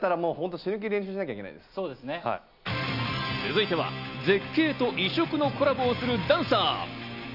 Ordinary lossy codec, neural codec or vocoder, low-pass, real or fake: none; none; 5.4 kHz; real